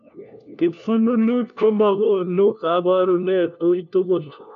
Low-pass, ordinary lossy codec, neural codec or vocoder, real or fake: 7.2 kHz; none; codec, 16 kHz, 1 kbps, FunCodec, trained on LibriTTS, 50 frames a second; fake